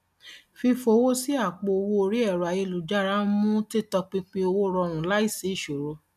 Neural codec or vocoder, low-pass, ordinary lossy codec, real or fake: none; 14.4 kHz; none; real